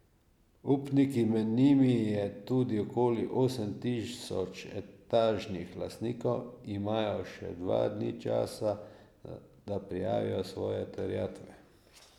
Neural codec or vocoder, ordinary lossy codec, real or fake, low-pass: none; none; real; 19.8 kHz